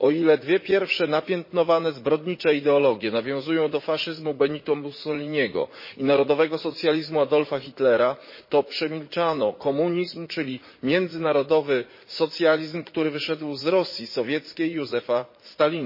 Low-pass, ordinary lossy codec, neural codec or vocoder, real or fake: 5.4 kHz; MP3, 24 kbps; vocoder, 44.1 kHz, 80 mel bands, Vocos; fake